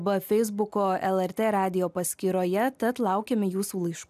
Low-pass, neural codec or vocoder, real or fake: 14.4 kHz; none; real